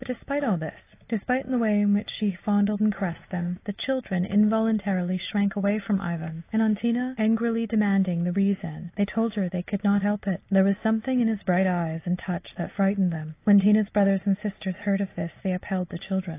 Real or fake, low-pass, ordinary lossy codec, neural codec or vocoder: real; 3.6 kHz; AAC, 24 kbps; none